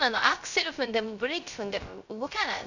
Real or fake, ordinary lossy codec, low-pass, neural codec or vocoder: fake; none; 7.2 kHz; codec, 16 kHz, 0.3 kbps, FocalCodec